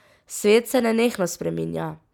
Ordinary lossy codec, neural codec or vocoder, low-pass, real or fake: none; none; 19.8 kHz; real